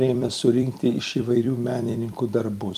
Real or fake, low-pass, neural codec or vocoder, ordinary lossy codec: fake; 14.4 kHz; vocoder, 44.1 kHz, 128 mel bands every 256 samples, BigVGAN v2; Opus, 32 kbps